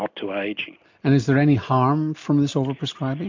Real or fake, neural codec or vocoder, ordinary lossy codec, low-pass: real; none; MP3, 64 kbps; 7.2 kHz